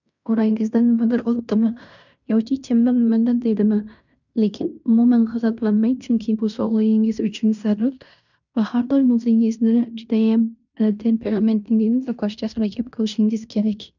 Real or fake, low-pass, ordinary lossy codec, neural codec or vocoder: fake; 7.2 kHz; none; codec, 16 kHz in and 24 kHz out, 0.9 kbps, LongCat-Audio-Codec, fine tuned four codebook decoder